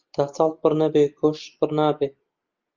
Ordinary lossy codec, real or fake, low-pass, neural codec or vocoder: Opus, 24 kbps; real; 7.2 kHz; none